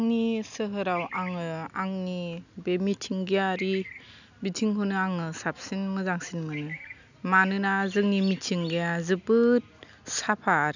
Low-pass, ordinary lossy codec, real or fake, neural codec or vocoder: 7.2 kHz; none; real; none